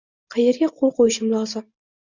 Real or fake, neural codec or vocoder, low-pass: real; none; 7.2 kHz